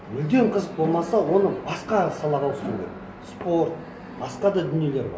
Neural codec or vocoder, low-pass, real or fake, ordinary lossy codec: none; none; real; none